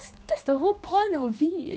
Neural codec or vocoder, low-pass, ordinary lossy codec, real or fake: codec, 16 kHz, 2 kbps, X-Codec, HuBERT features, trained on general audio; none; none; fake